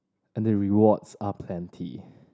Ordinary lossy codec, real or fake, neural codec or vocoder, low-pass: none; real; none; none